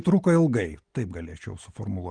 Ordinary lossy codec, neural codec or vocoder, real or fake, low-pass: Opus, 24 kbps; none; real; 9.9 kHz